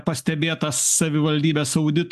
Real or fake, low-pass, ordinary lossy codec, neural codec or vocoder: real; 14.4 kHz; AAC, 96 kbps; none